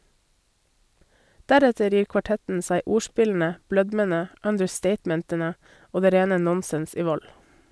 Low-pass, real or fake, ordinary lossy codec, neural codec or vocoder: none; real; none; none